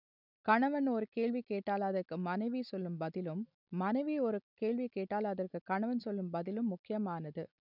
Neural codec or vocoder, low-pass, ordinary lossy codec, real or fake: none; 5.4 kHz; none; real